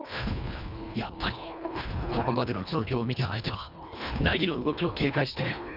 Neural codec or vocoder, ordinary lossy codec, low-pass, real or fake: codec, 24 kHz, 1.5 kbps, HILCodec; none; 5.4 kHz; fake